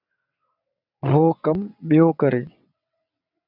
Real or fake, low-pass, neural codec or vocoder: fake; 5.4 kHz; vocoder, 22.05 kHz, 80 mel bands, WaveNeXt